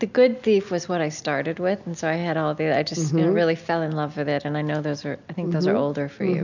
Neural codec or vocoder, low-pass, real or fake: none; 7.2 kHz; real